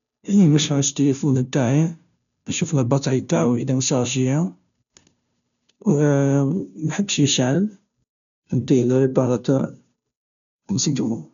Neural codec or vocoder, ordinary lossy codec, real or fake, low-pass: codec, 16 kHz, 0.5 kbps, FunCodec, trained on Chinese and English, 25 frames a second; none; fake; 7.2 kHz